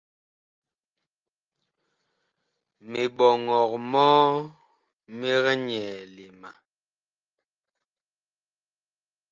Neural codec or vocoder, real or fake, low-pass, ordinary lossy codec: none; real; 7.2 kHz; Opus, 24 kbps